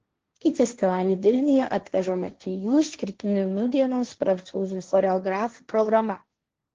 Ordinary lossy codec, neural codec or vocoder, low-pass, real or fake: Opus, 16 kbps; codec, 16 kHz, 1.1 kbps, Voila-Tokenizer; 7.2 kHz; fake